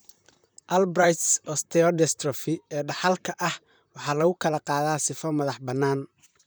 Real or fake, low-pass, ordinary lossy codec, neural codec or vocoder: fake; none; none; vocoder, 44.1 kHz, 128 mel bands, Pupu-Vocoder